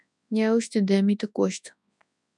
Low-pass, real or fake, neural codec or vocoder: 10.8 kHz; fake; codec, 24 kHz, 0.9 kbps, WavTokenizer, large speech release